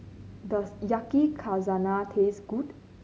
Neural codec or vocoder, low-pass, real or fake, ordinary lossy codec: none; none; real; none